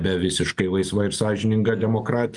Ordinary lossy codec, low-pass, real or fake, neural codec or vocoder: Opus, 32 kbps; 10.8 kHz; real; none